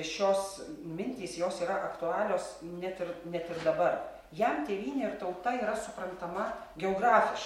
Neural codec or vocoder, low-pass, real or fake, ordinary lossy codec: none; 19.8 kHz; real; MP3, 64 kbps